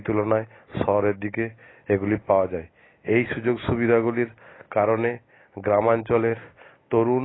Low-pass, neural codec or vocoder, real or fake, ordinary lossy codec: 7.2 kHz; none; real; AAC, 16 kbps